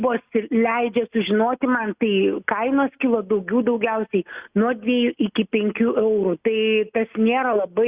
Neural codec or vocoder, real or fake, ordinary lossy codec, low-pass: none; real; Opus, 64 kbps; 3.6 kHz